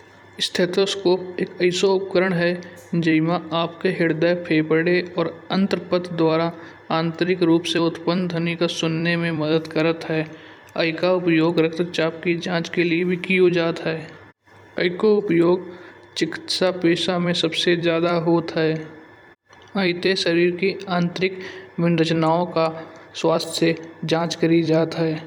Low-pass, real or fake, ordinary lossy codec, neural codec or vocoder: 19.8 kHz; fake; none; vocoder, 44.1 kHz, 128 mel bands every 256 samples, BigVGAN v2